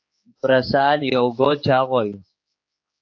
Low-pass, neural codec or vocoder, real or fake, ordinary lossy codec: 7.2 kHz; codec, 16 kHz, 4 kbps, X-Codec, HuBERT features, trained on general audio; fake; AAC, 48 kbps